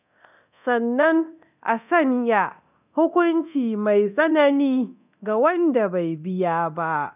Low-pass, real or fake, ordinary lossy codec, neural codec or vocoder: 3.6 kHz; fake; none; codec, 24 kHz, 0.9 kbps, DualCodec